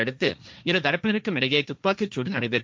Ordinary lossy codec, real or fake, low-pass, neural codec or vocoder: none; fake; none; codec, 16 kHz, 1.1 kbps, Voila-Tokenizer